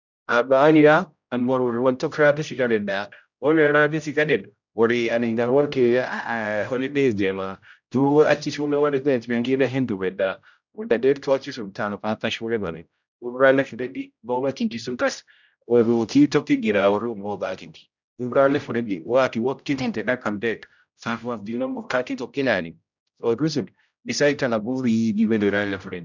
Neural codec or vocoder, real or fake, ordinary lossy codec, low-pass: codec, 16 kHz, 0.5 kbps, X-Codec, HuBERT features, trained on general audio; fake; none; 7.2 kHz